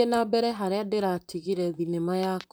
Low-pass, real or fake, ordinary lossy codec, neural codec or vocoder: none; fake; none; vocoder, 44.1 kHz, 128 mel bands, Pupu-Vocoder